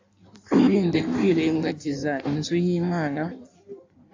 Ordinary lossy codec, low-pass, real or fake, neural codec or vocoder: AAC, 48 kbps; 7.2 kHz; fake; codec, 16 kHz in and 24 kHz out, 1.1 kbps, FireRedTTS-2 codec